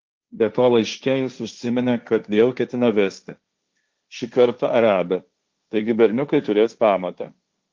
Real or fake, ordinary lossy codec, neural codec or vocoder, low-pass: fake; Opus, 32 kbps; codec, 16 kHz, 1.1 kbps, Voila-Tokenizer; 7.2 kHz